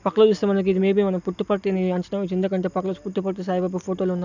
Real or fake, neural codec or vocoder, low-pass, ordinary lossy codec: real; none; 7.2 kHz; none